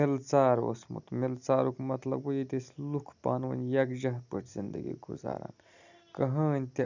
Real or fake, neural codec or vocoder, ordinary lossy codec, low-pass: real; none; none; 7.2 kHz